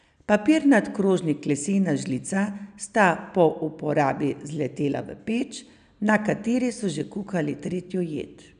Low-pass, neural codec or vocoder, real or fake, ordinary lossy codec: 9.9 kHz; none; real; none